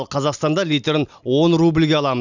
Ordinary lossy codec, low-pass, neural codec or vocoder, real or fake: none; 7.2 kHz; none; real